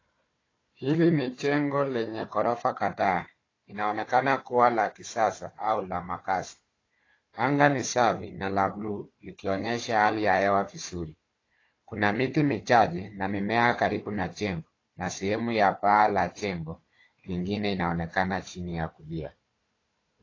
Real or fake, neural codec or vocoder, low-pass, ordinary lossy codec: fake; codec, 16 kHz, 4 kbps, FunCodec, trained on Chinese and English, 50 frames a second; 7.2 kHz; AAC, 32 kbps